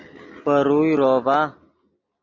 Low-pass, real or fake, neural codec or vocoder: 7.2 kHz; real; none